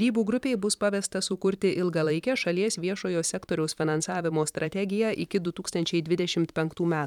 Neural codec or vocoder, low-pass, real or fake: vocoder, 44.1 kHz, 128 mel bands every 512 samples, BigVGAN v2; 19.8 kHz; fake